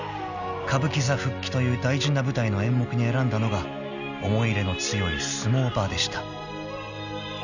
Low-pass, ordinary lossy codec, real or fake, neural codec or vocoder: 7.2 kHz; none; real; none